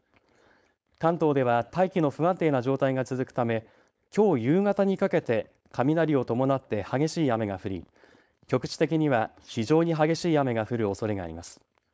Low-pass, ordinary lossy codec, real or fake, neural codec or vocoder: none; none; fake; codec, 16 kHz, 4.8 kbps, FACodec